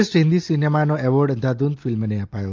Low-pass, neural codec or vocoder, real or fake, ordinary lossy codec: 7.2 kHz; none; real; Opus, 24 kbps